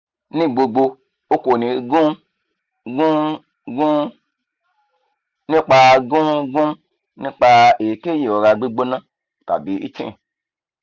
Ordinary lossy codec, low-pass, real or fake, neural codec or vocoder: Opus, 64 kbps; 7.2 kHz; real; none